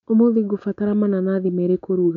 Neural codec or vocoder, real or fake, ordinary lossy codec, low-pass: none; real; none; 7.2 kHz